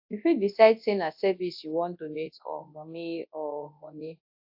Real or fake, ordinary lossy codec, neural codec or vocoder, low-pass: fake; AAC, 48 kbps; codec, 24 kHz, 0.9 kbps, WavTokenizer, large speech release; 5.4 kHz